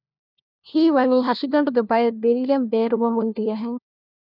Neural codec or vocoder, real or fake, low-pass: codec, 16 kHz, 1 kbps, FunCodec, trained on LibriTTS, 50 frames a second; fake; 5.4 kHz